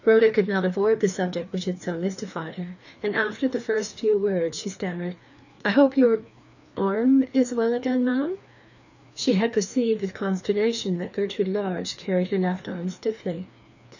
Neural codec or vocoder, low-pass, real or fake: codec, 16 kHz, 2 kbps, FreqCodec, larger model; 7.2 kHz; fake